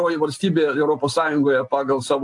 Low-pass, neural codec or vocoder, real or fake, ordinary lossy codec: 10.8 kHz; none; real; AAC, 64 kbps